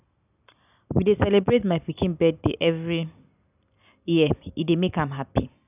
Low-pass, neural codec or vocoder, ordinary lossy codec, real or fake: 3.6 kHz; none; none; real